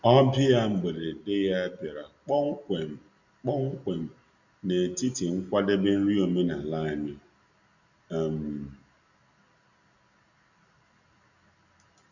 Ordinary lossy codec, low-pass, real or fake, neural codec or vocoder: none; 7.2 kHz; real; none